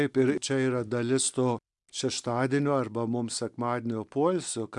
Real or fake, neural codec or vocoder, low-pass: real; none; 10.8 kHz